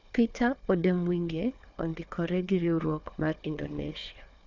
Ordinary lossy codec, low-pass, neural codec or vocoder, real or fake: none; 7.2 kHz; codec, 16 kHz, 4 kbps, FunCodec, trained on Chinese and English, 50 frames a second; fake